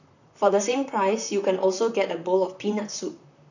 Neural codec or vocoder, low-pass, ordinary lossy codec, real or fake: vocoder, 44.1 kHz, 128 mel bands, Pupu-Vocoder; 7.2 kHz; none; fake